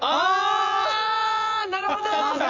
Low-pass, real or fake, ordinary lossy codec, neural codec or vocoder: 7.2 kHz; fake; none; vocoder, 24 kHz, 100 mel bands, Vocos